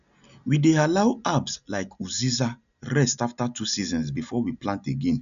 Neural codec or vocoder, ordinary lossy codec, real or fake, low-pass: none; none; real; 7.2 kHz